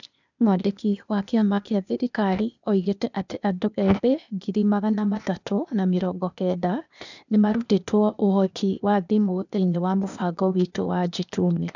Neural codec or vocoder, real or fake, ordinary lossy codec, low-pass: codec, 16 kHz, 0.8 kbps, ZipCodec; fake; none; 7.2 kHz